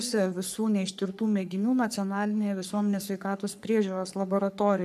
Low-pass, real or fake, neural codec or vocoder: 14.4 kHz; fake; codec, 44.1 kHz, 3.4 kbps, Pupu-Codec